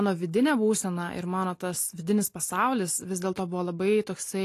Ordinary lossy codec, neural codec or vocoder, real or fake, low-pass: AAC, 48 kbps; none; real; 14.4 kHz